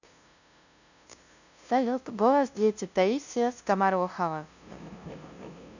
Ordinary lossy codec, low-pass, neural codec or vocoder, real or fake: none; 7.2 kHz; codec, 16 kHz, 0.5 kbps, FunCodec, trained on LibriTTS, 25 frames a second; fake